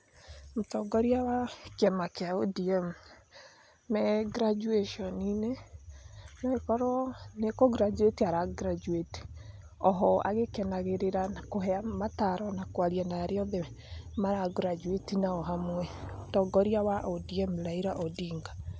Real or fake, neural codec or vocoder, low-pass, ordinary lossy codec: real; none; none; none